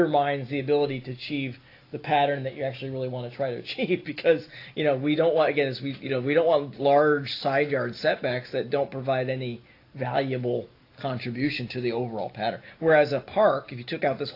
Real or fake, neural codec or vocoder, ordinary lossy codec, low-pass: real; none; AAC, 32 kbps; 5.4 kHz